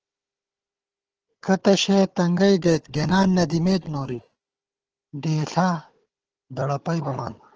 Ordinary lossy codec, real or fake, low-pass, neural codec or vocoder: Opus, 16 kbps; fake; 7.2 kHz; codec, 16 kHz, 4 kbps, FunCodec, trained on Chinese and English, 50 frames a second